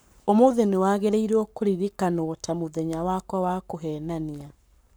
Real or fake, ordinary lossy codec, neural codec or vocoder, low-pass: fake; none; codec, 44.1 kHz, 7.8 kbps, Pupu-Codec; none